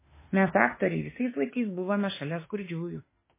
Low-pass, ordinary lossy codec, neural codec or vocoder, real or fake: 3.6 kHz; MP3, 16 kbps; autoencoder, 48 kHz, 32 numbers a frame, DAC-VAE, trained on Japanese speech; fake